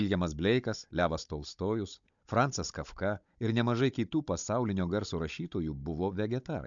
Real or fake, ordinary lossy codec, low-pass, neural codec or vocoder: fake; MP3, 64 kbps; 7.2 kHz; codec, 16 kHz, 16 kbps, FunCodec, trained on Chinese and English, 50 frames a second